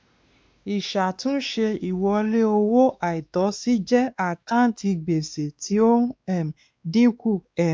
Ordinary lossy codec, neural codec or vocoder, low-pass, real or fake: none; codec, 16 kHz, 2 kbps, X-Codec, WavLM features, trained on Multilingual LibriSpeech; none; fake